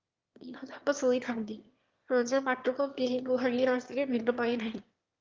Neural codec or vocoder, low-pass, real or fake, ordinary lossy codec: autoencoder, 22.05 kHz, a latent of 192 numbers a frame, VITS, trained on one speaker; 7.2 kHz; fake; Opus, 32 kbps